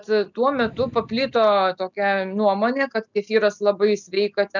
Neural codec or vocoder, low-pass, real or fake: none; 7.2 kHz; real